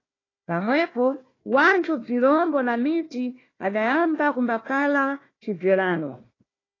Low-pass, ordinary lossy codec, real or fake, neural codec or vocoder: 7.2 kHz; AAC, 32 kbps; fake; codec, 16 kHz, 1 kbps, FunCodec, trained on Chinese and English, 50 frames a second